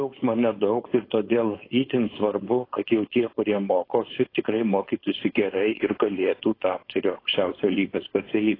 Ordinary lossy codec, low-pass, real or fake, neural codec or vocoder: AAC, 24 kbps; 5.4 kHz; fake; codec, 16 kHz, 4.8 kbps, FACodec